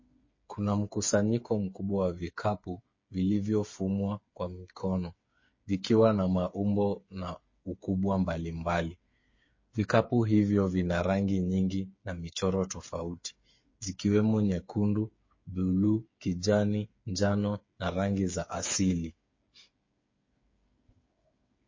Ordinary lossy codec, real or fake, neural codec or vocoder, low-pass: MP3, 32 kbps; fake; codec, 16 kHz, 8 kbps, FreqCodec, smaller model; 7.2 kHz